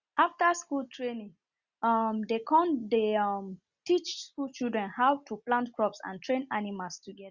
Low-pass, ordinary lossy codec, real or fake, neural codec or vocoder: 7.2 kHz; Opus, 64 kbps; real; none